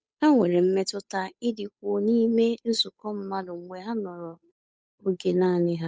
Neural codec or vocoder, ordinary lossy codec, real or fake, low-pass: codec, 16 kHz, 8 kbps, FunCodec, trained on Chinese and English, 25 frames a second; none; fake; none